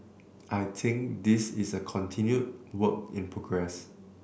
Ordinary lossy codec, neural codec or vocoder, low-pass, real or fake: none; none; none; real